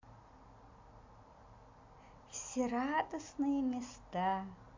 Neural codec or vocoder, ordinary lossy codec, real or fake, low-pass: none; MP3, 48 kbps; real; 7.2 kHz